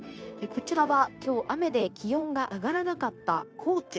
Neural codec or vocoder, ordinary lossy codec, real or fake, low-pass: codec, 16 kHz, 0.9 kbps, LongCat-Audio-Codec; none; fake; none